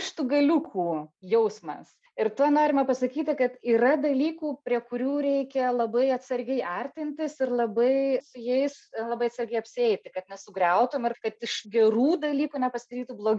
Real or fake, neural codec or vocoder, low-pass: real; none; 9.9 kHz